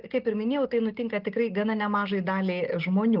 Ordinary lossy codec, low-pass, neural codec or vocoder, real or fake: Opus, 32 kbps; 5.4 kHz; none; real